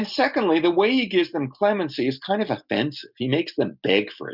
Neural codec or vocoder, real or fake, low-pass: none; real; 5.4 kHz